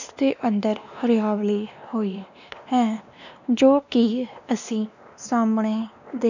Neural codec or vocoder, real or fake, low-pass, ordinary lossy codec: codec, 16 kHz, 2 kbps, X-Codec, WavLM features, trained on Multilingual LibriSpeech; fake; 7.2 kHz; none